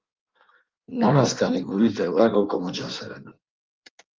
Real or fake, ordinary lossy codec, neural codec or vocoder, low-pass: fake; Opus, 32 kbps; codec, 16 kHz in and 24 kHz out, 1.1 kbps, FireRedTTS-2 codec; 7.2 kHz